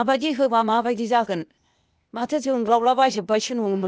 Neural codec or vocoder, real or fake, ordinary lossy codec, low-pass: codec, 16 kHz, 0.8 kbps, ZipCodec; fake; none; none